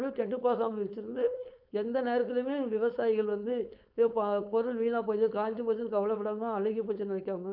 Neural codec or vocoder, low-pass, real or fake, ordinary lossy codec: codec, 16 kHz, 4.8 kbps, FACodec; 5.4 kHz; fake; none